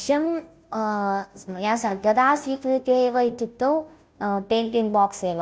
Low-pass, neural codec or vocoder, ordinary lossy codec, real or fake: none; codec, 16 kHz, 0.5 kbps, FunCodec, trained on Chinese and English, 25 frames a second; none; fake